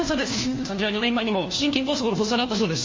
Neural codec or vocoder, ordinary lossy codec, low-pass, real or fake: codec, 16 kHz, 1 kbps, FunCodec, trained on LibriTTS, 50 frames a second; MP3, 32 kbps; 7.2 kHz; fake